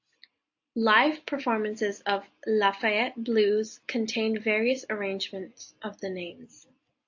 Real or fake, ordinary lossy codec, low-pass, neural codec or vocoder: real; AAC, 48 kbps; 7.2 kHz; none